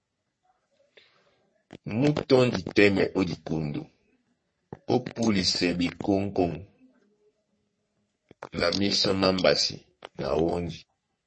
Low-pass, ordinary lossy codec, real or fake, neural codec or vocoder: 9.9 kHz; MP3, 32 kbps; fake; codec, 44.1 kHz, 3.4 kbps, Pupu-Codec